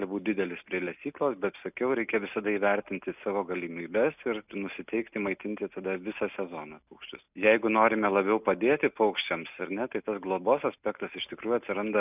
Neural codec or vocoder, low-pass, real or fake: none; 3.6 kHz; real